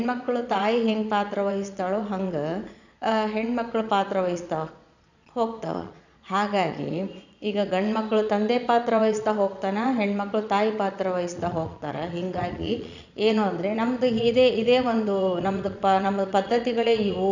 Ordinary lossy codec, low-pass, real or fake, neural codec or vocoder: none; 7.2 kHz; fake; vocoder, 22.05 kHz, 80 mel bands, Vocos